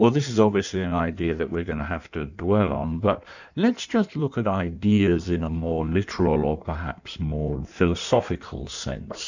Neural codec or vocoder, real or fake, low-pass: codec, 16 kHz in and 24 kHz out, 1.1 kbps, FireRedTTS-2 codec; fake; 7.2 kHz